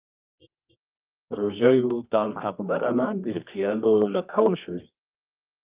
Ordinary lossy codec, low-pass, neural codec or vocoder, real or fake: Opus, 24 kbps; 3.6 kHz; codec, 24 kHz, 0.9 kbps, WavTokenizer, medium music audio release; fake